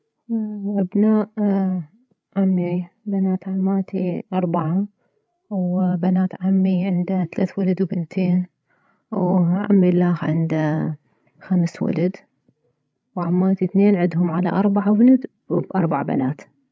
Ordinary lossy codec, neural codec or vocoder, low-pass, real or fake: none; codec, 16 kHz, 16 kbps, FreqCodec, larger model; none; fake